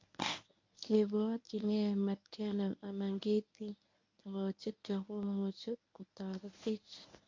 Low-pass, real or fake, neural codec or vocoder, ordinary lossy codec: 7.2 kHz; fake; codec, 24 kHz, 0.9 kbps, WavTokenizer, medium speech release version 1; MP3, 48 kbps